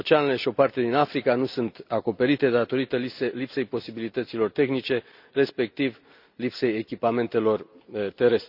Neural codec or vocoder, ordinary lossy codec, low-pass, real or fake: none; none; 5.4 kHz; real